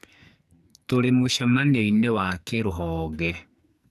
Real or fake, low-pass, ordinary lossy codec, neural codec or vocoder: fake; 14.4 kHz; none; codec, 44.1 kHz, 2.6 kbps, SNAC